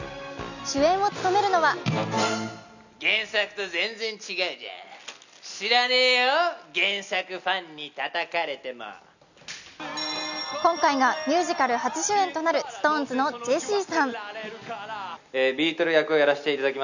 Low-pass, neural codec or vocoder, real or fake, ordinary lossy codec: 7.2 kHz; none; real; none